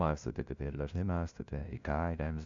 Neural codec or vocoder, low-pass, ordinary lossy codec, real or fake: codec, 16 kHz, 0.5 kbps, FunCodec, trained on LibriTTS, 25 frames a second; 7.2 kHz; AAC, 64 kbps; fake